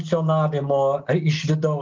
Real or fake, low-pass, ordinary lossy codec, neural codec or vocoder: real; 7.2 kHz; Opus, 24 kbps; none